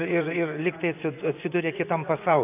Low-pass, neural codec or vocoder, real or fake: 3.6 kHz; vocoder, 24 kHz, 100 mel bands, Vocos; fake